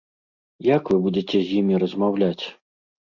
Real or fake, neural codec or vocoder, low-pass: real; none; 7.2 kHz